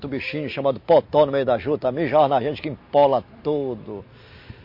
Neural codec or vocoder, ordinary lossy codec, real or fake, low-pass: none; MP3, 32 kbps; real; 5.4 kHz